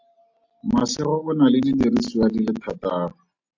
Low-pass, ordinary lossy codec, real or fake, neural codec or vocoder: 7.2 kHz; AAC, 48 kbps; real; none